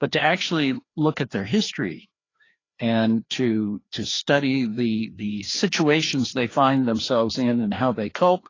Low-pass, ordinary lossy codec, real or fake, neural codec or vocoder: 7.2 kHz; AAC, 32 kbps; fake; codec, 16 kHz, 2 kbps, FreqCodec, larger model